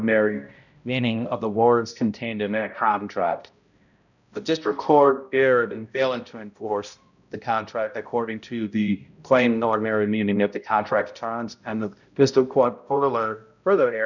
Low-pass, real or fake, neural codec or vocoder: 7.2 kHz; fake; codec, 16 kHz, 0.5 kbps, X-Codec, HuBERT features, trained on balanced general audio